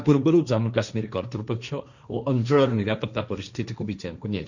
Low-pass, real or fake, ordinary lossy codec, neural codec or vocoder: 7.2 kHz; fake; none; codec, 16 kHz, 1.1 kbps, Voila-Tokenizer